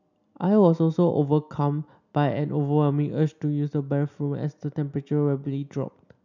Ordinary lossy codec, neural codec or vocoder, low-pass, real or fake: none; none; 7.2 kHz; real